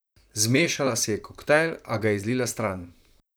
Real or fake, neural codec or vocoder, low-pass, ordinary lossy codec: fake; vocoder, 44.1 kHz, 128 mel bands, Pupu-Vocoder; none; none